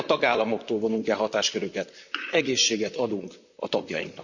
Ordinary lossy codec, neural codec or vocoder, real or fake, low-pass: none; vocoder, 44.1 kHz, 128 mel bands, Pupu-Vocoder; fake; 7.2 kHz